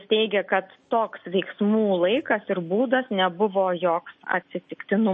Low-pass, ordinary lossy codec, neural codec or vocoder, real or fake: 10.8 kHz; MP3, 32 kbps; none; real